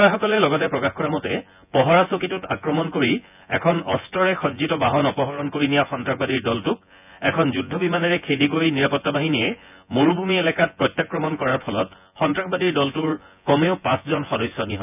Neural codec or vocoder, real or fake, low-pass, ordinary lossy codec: vocoder, 24 kHz, 100 mel bands, Vocos; fake; 3.6 kHz; none